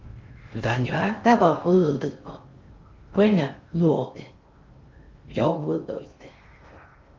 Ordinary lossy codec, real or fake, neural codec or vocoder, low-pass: Opus, 32 kbps; fake; codec, 16 kHz in and 24 kHz out, 0.6 kbps, FocalCodec, streaming, 4096 codes; 7.2 kHz